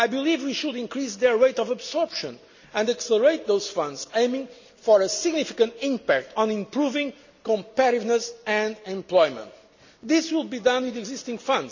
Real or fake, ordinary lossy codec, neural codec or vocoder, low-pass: real; none; none; 7.2 kHz